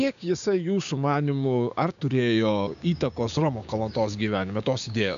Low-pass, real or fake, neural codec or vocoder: 7.2 kHz; fake; codec, 16 kHz, 6 kbps, DAC